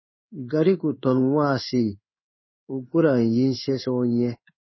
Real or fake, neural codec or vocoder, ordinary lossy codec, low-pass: fake; codec, 16 kHz in and 24 kHz out, 1 kbps, XY-Tokenizer; MP3, 24 kbps; 7.2 kHz